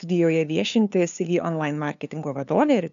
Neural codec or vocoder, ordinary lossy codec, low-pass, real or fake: codec, 16 kHz, 2 kbps, FunCodec, trained on LibriTTS, 25 frames a second; AAC, 96 kbps; 7.2 kHz; fake